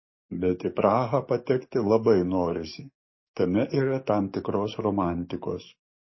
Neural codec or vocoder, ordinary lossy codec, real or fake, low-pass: codec, 44.1 kHz, 7.8 kbps, DAC; MP3, 24 kbps; fake; 7.2 kHz